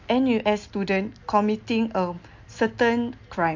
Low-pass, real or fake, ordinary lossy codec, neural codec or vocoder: 7.2 kHz; real; MP3, 48 kbps; none